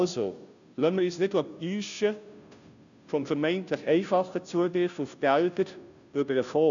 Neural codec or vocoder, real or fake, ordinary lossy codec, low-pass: codec, 16 kHz, 0.5 kbps, FunCodec, trained on Chinese and English, 25 frames a second; fake; none; 7.2 kHz